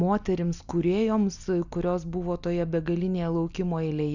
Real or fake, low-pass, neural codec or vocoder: real; 7.2 kHz; none